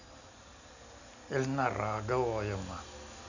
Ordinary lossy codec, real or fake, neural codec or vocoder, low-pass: none; real; none; 7.2 kHz